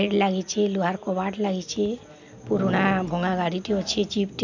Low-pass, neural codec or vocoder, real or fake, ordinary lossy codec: 7.2 kHz; none; real; none